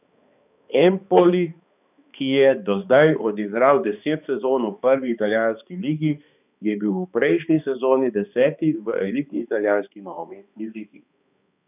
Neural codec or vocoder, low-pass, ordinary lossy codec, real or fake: codec, 16 kHz, 2 kbps, X-Codec, HuBERT features, trained on balanced general audio; 3.6 kHz; none; fake